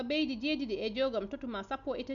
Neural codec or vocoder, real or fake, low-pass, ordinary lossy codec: none; real; 7.2 kHz; none